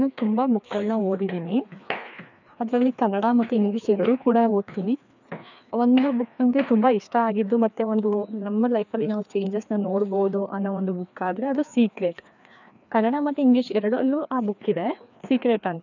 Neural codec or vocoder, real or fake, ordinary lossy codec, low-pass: codec, 16 kHz, 2 kbps, FreqCodec, larger model; fake; none; 7.2 kHz